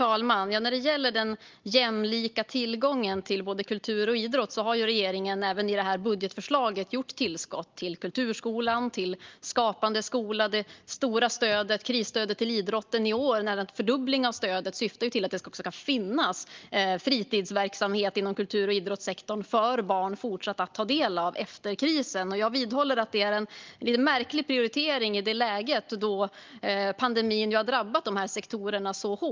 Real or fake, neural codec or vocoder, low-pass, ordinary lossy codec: real; none; 7.2 kHz; Opus, 32 kbps